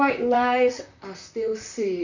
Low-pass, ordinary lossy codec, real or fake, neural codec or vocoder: 7.2 kHz; none; fake; vocoder, 44.1 kHz, 128 mel bands, Pupu-Vocoder